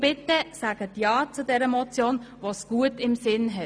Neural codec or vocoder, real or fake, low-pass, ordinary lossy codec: none; real; none; none